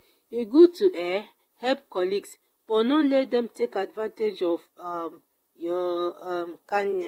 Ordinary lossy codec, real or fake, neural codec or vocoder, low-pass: AAC, 48 kbps; fake; vocoder, 44.1 kHz, 128 mel bands, Pupu-Vocoder; 19.8 kHz